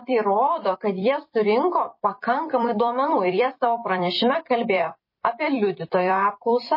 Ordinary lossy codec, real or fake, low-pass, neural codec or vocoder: MP3, 24 kbps; fake; 5.4 kHz; vocoder, 44.1 kHz, 128 mel bands every 256 samples, BigVGAN v2